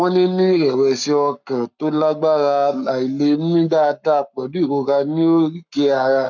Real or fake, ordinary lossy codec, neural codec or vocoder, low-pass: fake; none; codec, 44.1 kHz, 7.8 kbps, Pupu-Codec; 7.2 kHz